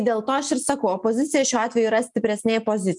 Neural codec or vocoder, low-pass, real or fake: none; 10.8 kHz; real